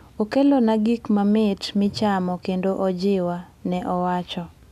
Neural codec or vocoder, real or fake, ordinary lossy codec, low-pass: none; real; none; 14.4 kHz